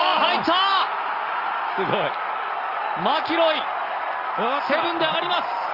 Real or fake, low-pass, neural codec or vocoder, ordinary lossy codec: real; 5.4 kHz; none; Opus, 24 kbps